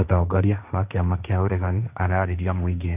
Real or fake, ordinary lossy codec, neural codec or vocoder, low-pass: fake; none; codec, 16 kHz, 1.1 kbps, Voila-Tokenizer; 3.6 kHz